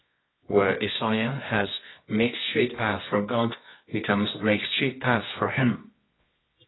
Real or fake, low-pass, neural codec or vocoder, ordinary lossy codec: fake; 7.2 kHz; codec, 24 kHz, 0.9 kbps, WavTokenizer, medium music audio release; AAC, 16 kbps